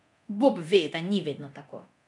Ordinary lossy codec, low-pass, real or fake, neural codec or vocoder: none; 10.8 kHz; fake; codec, 24 kHz, 0.9 kbps, DualCodec